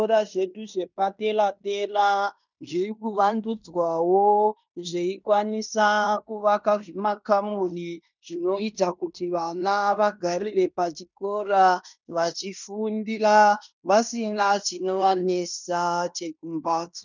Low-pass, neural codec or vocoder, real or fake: 7.2 kHz; codec, 16 kHz in and 24 kHz out, 0.9 kbps, LongCat-Audio-Codec, fine tuned four codebook decoder; fake